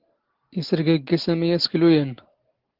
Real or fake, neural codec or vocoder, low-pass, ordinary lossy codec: real; none; 5.4 kHz; Opus, 16 kbps